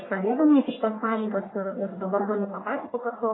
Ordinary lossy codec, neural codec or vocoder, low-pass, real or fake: AAC, 16 kbps; codec, 44.1 kHz, 1.7 kbps, Pupu-Codec; 7.2 kHz; fake